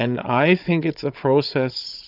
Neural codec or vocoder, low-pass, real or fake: codec, 16 kHz, 16 kbps, FunCodec, trained on Chinese and English, 50 frames a second; 5.4 kHz; fake